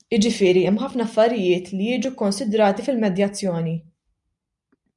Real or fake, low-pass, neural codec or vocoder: real; 10.8 kHz; none